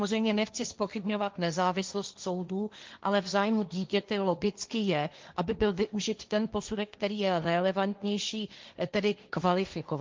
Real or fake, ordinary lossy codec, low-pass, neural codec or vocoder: fake; Opus, 32 kbps; 7.2 kHz; codec, 16 kHz, 1.1 kbps, Voila-Tokenizer